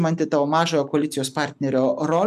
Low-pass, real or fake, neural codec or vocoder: 14.4 kHz; real; none